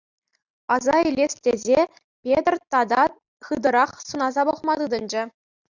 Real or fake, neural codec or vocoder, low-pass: real; none; 7.2 kHz